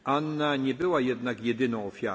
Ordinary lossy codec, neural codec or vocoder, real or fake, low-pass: none; none; real; none